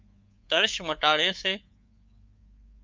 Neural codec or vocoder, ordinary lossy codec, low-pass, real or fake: codec, 24 kHz, 3.1 kbps, DualCodec; Opus, 24 kbps; 7.2 kHz; fake